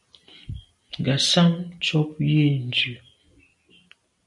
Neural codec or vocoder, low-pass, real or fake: none; 10.8 kHz; real